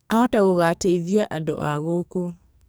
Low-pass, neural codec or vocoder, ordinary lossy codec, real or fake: none; codec, 44.1 kHz, 2.6 kbps, SNAC; none; fake